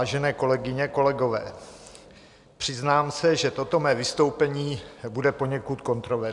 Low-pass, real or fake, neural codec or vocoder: 10.8 kHz; real; none